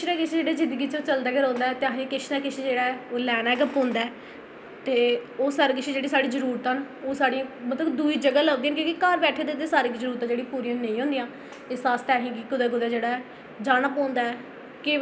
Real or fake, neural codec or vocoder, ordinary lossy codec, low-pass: real; none; none; none